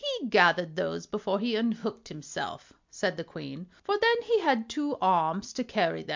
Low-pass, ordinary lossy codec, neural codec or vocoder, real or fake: 7.2 kHz; MP3, 64 kbps; none; real